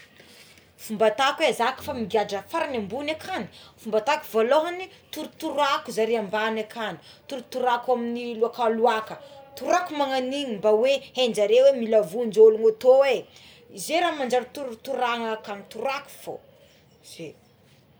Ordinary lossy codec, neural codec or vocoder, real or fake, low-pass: none; none; real; none